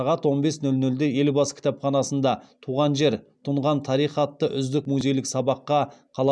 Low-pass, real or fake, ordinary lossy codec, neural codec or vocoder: 9.9 kHz; real; none; none